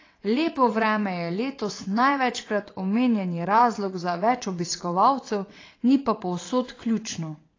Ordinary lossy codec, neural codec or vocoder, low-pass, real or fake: AAC, 32 kbps; vocoder, 22.05 kHz, 80 mel bands, WaveNeXt; 7.2 kHz; fake